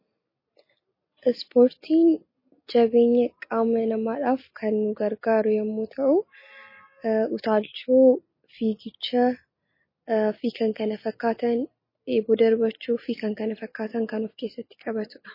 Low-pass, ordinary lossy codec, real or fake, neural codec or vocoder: 5.4 kHz; MP3, 24 kbps; real; none